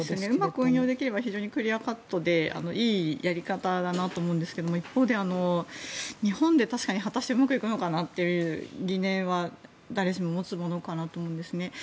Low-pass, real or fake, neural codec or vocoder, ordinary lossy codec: none; real; none; none